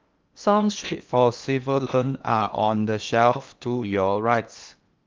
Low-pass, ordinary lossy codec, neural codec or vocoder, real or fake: 7.2 kHz; Opus, 24 kbps; codec, 16 kHz in and 24 kHz out, 0.8 kbps, FocalCodec, streaming, 65536 codes; fake